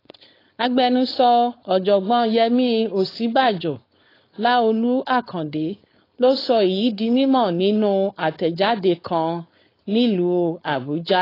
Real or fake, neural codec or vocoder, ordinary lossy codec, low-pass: fake; codec, 16 kHz, 4.8 kbps, FACodec; AAC, 24 kbps; 5.4 kHz